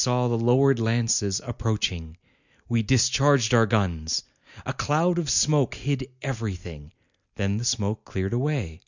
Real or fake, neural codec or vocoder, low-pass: real; none; 7.2 kHz